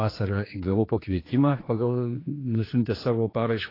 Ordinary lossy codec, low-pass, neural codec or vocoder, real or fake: AAC, 24 kbps; 5.4 kHz; codec, 16 kHz, 2 kbps, X-Codec, HuBERT features, trained on general audio; fake